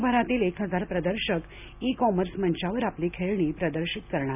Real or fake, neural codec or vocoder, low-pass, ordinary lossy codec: real; none; 3.6 kHz; none